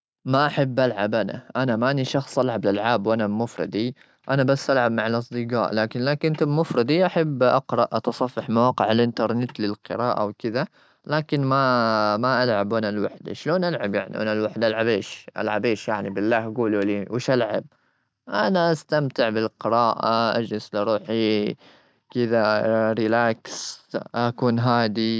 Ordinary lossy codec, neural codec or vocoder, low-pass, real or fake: none; none; none; real